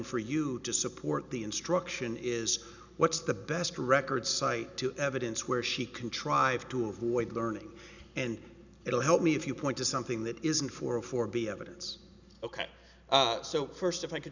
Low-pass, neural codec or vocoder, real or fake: 7.2 kHz; none; real